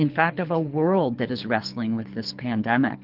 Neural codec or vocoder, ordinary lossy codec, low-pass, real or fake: codec, 24 kHz, 6 kbps, HILCodec; Opus, 16 kbps; 5.4 kHz; fake